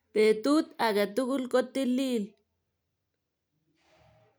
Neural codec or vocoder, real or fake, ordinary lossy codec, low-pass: none; real; none; none